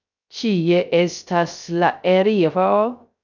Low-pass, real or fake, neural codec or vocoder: 7.2 kHz; fake; codec, 16 kHz, 0.3 kbps, FocalCodec